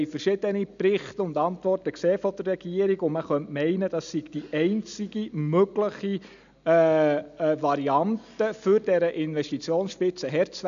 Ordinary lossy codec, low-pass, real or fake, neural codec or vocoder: MP3, 96 kbps; 7.2 kHz; real; none